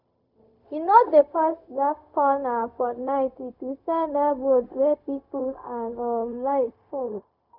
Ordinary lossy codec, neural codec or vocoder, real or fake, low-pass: none; codec, 16 kHz, 0.4 kbps, LongCat-Audio-Codec; fake; 5.4 kHz